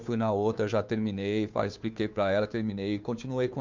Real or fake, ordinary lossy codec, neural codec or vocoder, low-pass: fake; MP3, 64 kbps; codec, 16 kHz, 2 kbps, FunCodec, trained on Chinese and English, 25 frames a second; 7.2 kHz